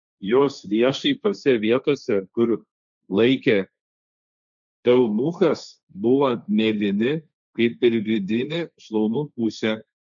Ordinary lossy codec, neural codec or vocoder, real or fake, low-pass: MP3, 96 kbps; codec, 16 kHz, 1.1 kbps, Voila-Tokenizer; fake; 7.2 kHz